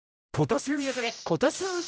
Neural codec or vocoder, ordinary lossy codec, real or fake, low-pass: codec, 16 kHz, 0.5 kbps, X-Codec, HuBERT features, trained on general audio; none; fake; none